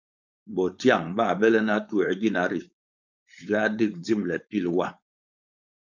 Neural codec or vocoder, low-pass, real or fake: codec, 16 kHz, 4.8 kbps, FACodec; 7.2 kHz; fake